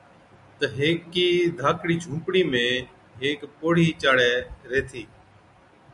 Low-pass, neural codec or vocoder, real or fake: 10.8 kHz; none; real